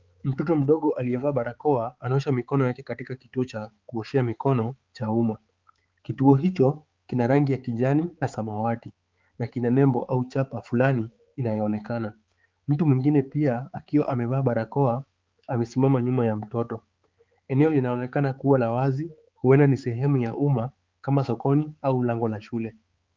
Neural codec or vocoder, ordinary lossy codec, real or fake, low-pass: codec, 16 kHz, 4 kbps, X-Codec, HuBERT features, trained on balanced general audio; Opus, 32 kbps; fake; 7.2 kHz